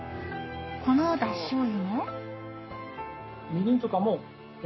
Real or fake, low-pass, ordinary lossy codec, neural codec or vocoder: fake; 7.2 kHz; MP3, 24 kbps; codec, 44.1 kHz, 7.8 kbps, Pupu-Codec